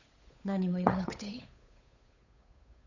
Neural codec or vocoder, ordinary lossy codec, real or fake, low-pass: codec, 16 kHz, 8 kbps, FunCodec, trained on Chinese and English, 25 frames a second; none; fake; 7.2 kHz